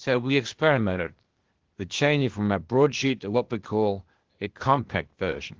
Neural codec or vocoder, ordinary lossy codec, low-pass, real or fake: codec, 16 kHz, 0.8 kbps, ZipCodec; Opus, 16 kbps; 7.2 kHz; fake